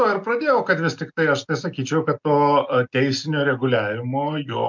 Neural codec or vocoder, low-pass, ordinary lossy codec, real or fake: none; 7.2 kHz; MP3, 64 kbps; real